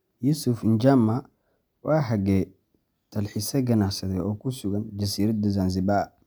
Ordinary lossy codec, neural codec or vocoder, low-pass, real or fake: none; none; none; real